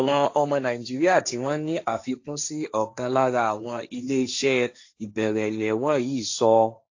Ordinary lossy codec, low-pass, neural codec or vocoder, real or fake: AAC, 48 kbps; 7.2 kHz; codec, 16 kHz, 1.1 kbps, Voila-Tokenizer; fake